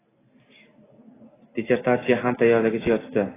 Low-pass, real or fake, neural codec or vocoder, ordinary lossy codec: 3.6 kHz; real; none; AAC, 16 kbps